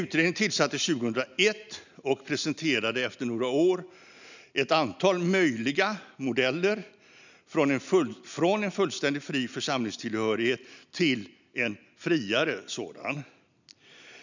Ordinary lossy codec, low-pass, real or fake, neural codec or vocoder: none; 7.2 kHz; real; none